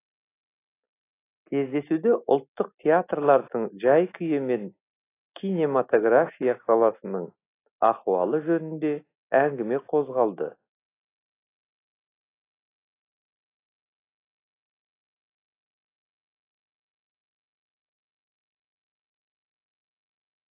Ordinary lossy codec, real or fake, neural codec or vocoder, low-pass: AAC, 24 kbps; real; none; 3.6 kHz